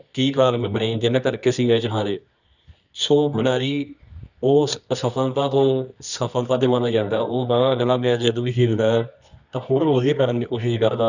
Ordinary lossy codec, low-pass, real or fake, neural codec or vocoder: none; 7.2 kHz; fake; codec, 24 kHz, 0.9 kbps, WavTokenizer, medium music audio release